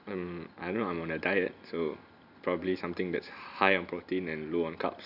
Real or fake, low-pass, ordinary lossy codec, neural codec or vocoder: real; 5.4 kHz; none; none